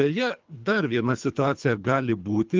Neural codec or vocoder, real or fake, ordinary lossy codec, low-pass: codec, 24 kHz, 3 kbps, HILCodec; fake; Opus, 32 kbps; 7.2 kHz